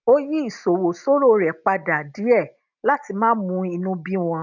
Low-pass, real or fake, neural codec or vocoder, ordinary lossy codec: 7.2 kHz; real; none; none